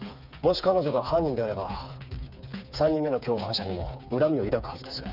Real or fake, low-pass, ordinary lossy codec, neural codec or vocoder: fake; 5.4 kHz; none; codec, 16 kHz, 4 kbps, FreqCodec, smaller model